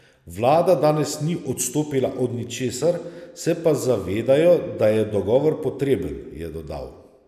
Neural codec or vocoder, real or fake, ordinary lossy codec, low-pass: none; real; none; 14.4 kHz